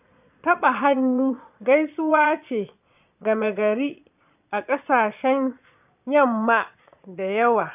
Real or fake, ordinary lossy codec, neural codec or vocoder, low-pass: fake; none; vocoder, 22.05 kHz, 80 mel bands, Vocos; 3.6 kHz